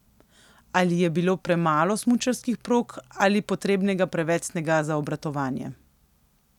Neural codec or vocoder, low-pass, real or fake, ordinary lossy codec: none; 19.8 kHz; real; none